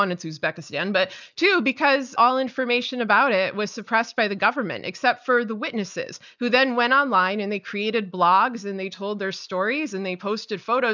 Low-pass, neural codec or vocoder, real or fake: 7.2 kHz; none; real